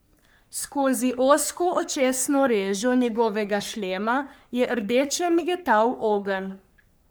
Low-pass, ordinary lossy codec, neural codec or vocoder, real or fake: none; none; codec, 44.1 kHz, 3.4 kbps, Pupu-Codec; fake